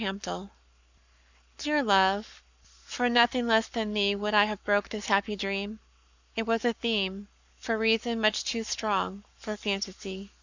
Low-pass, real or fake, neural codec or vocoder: 7.2 kHz; fake; codec, 44.1 kHz, 7.8 kbps, Pupu-Codec